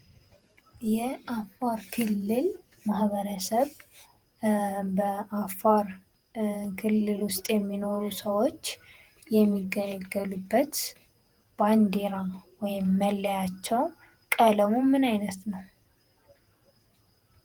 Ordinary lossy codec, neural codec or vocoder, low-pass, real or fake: Opus, 24 kbps; vocoder, 48 kHz, 128 mel bands, Vocos; 19.8 kHz; fake